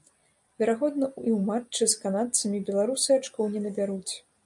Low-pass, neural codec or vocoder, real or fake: 10.8 kHz; none; real